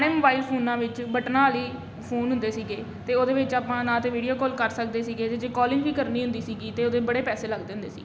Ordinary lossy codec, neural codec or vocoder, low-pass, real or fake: none; none; none; real